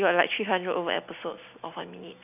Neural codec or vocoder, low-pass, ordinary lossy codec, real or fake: none; 3.6 kHz; none; real